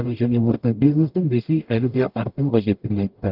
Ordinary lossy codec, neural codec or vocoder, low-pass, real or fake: Opus, 32 kbps; codec, 44.1 kHz, 0.9 kbps, DAC; 5.4 kHz; fake